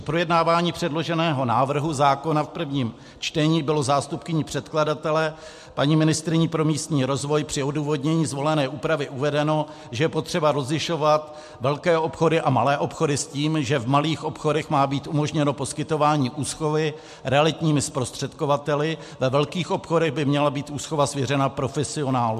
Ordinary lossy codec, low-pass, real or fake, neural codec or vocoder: MP3, 64 kbps; 14.4 kHz; real; none